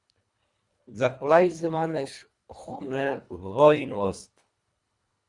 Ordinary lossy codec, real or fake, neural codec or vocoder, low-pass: Opus, 64 kbps; fake; codec, 24 kHz, 1.5 kbps, HILCodec; 10.8 kHz